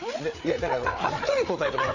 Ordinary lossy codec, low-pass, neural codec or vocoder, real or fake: none; 7.2 kHz; codec, 16 kHz, 8 kbps, FreqCodec, larger model; fake